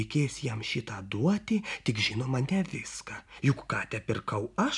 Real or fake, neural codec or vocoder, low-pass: real; none; 10.8 kHz